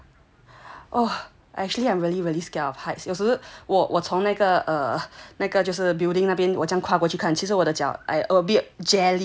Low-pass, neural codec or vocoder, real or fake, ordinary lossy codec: none; none; real; none